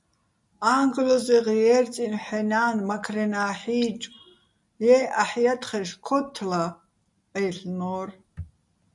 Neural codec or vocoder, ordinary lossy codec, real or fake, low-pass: none; MP3, 96 kbps; real; 10.8 kHz